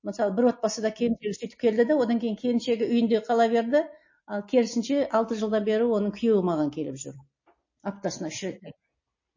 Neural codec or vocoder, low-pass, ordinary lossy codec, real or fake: none; 7.2 kHz; MP3, 32 kbps; real